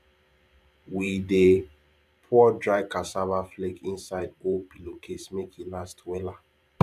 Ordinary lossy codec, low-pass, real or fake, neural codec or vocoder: none; 14.4 kHz; fake; vocoder, 44.1 kHz, 128 mel bands every 512 samples, BigVGAN v2